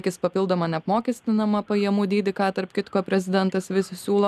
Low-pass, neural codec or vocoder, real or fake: 14.4 kHz; none; real